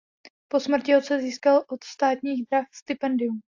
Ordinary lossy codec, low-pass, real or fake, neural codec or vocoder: AAC, 48 kbps; 7.2 kHz; real; none